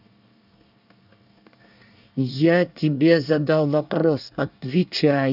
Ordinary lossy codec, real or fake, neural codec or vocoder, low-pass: none; fake; codec, 24 kHz, 1 kbps, SNAC; 5.4 kHz